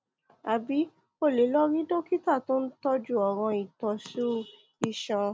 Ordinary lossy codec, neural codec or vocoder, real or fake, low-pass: none; none; real; none